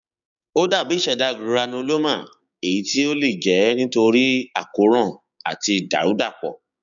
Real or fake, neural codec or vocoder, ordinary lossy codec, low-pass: fake; codec, 16 kHz, 6 kbps, DAC; none; 7.2 kHz